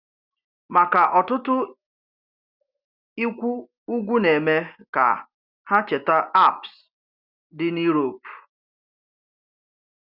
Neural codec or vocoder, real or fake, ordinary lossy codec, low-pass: none; real; Opus, 64 kbps; 5.4 kHz